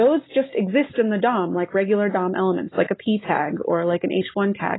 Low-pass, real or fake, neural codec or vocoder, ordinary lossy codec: 7.2 kHz; real; none; AAC, 16 kbps